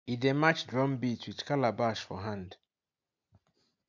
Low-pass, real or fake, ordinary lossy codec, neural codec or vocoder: 7.2 kHz; real; none; none